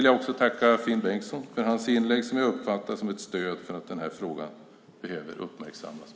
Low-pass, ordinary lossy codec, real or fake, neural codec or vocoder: none; none; real; none